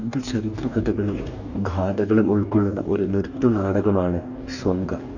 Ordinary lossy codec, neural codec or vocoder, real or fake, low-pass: none; codec, 44.1 kHz, 2.6 kbps, DAC; fake; 7.2 kHz